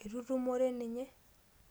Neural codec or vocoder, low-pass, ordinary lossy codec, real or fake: none; none; none; real